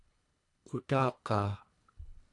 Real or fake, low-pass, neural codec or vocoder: fake; 10.8 kHz; codec, 24 kHz, 1.5 kbps, HILCodec